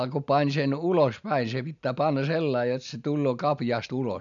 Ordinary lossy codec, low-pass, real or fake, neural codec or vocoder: none; 7.2 kHz; real; none